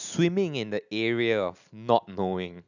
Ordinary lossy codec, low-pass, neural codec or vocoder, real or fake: none; 7.2 kHz; none; real